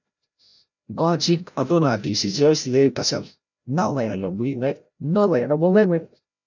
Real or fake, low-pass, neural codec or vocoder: fake; 7.2 kHz; codec, 16 kHz, 0.5 kbps, FreqCodec, larger model